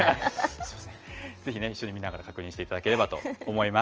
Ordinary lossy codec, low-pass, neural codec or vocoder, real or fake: Opus, 24 kbps; 7.2 kHz; none; real